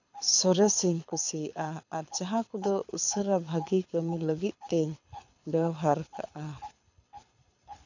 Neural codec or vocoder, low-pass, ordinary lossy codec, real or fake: codec, 24 kHz, 6 kbps, HILCodec; 7.2 kHz; none; fake